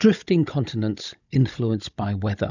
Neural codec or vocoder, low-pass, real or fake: codec, 16 kHz, 16 kbps, FunCodec, trained on Chinese and English, 50 frames a second; 7.2 kHz; fake